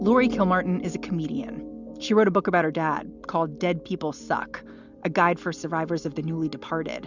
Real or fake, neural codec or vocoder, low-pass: real; none; 7.2 kHz